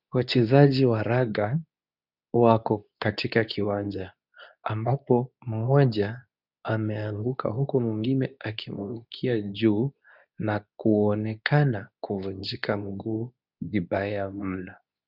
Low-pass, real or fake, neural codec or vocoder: 5.4 kHz; fake; codec, 24 kHz, 0.9 kbps, WavTokenizer, medium speech release version 2